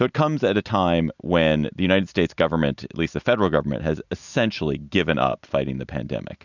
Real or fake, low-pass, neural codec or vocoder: real; 7.2 kHz; none